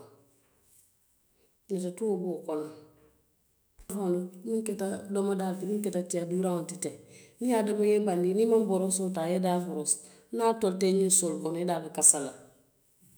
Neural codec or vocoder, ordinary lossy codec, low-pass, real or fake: autoencoder, 48 kHz, 128 numbers a frame, DAC-VAE, trained on Japanese speech; none; none; fake